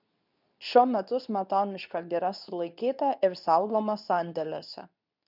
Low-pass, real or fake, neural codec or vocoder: 5.4 kHz; fake; codec, 24 kHz, 0.9 kbps, WavTokenizer, medium speech release version 2